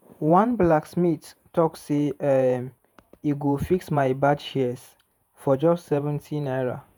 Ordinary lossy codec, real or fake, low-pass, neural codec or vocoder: none; fake; none; vocoder, 48 kHz, 128 mel bands, Vocos